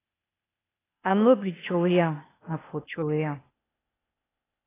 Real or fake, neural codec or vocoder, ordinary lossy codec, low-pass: fake; codec, 16 kHz, 0.8 kbps, ZipCodec; AAC, 16 kbps; 3.6 kHz